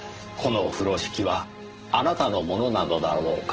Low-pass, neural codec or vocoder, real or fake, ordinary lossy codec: 7.2 kHz; vocoder, 44.1 kHz, 128 mel bands every 512 samples, BigVGAN v2; fake; Opus, 16 kbps